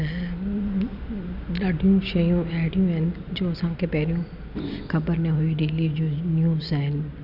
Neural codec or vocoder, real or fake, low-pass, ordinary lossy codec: none; real; 5.4 kHz; none